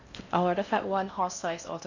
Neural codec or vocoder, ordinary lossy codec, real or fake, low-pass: codec, 16 kHz in and 24 kHz out, 0.6 kbps, FocalCodec, streaming, 4096 codes; none; fake; 7.2 kHz